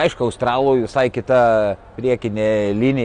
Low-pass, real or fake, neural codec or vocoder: 9.9 kHz; real; none